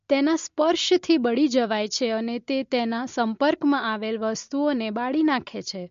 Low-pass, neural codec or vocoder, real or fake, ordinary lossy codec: 7.2 kHz; none; real; MP3, 48 kbps